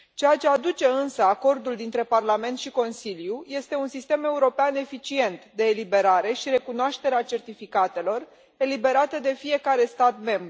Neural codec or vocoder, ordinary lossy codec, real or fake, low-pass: none; none; real; none